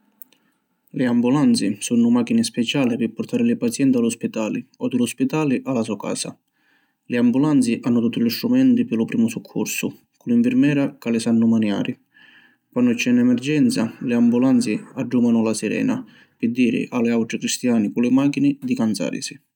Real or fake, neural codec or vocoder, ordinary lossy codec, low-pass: real; none; none; 19.8 kHz